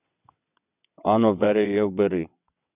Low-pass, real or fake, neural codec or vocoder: 3.6 kHz; fake; vocoder, 22.05 kHz, 80 mel bands, WaveNeXt